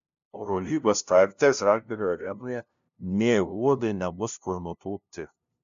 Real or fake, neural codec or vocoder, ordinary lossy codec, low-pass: fake; codec, 16 kHz, 0.5 kbps, FunCodec, trained on LibriTTS, 25 frames a second; MP3, 64 kbps; 7.2 kHz